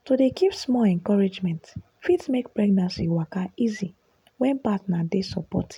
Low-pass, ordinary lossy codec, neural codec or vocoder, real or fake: 19.8 kHz; none; none; real